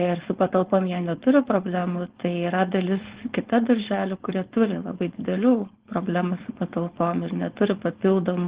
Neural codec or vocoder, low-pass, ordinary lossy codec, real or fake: codec, 16 kHz, 4.8 kbps, FACodec; 3.6 kHz; Opus, 16 kbps; fake